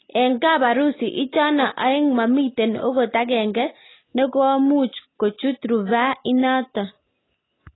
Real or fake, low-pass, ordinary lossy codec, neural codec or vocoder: real; 7.2 kHz; AAC, 16 kbps; none